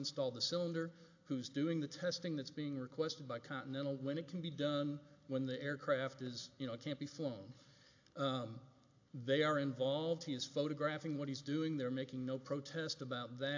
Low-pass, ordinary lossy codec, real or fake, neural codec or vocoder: 7.2 kHz; AAC, 48 kbps; real; none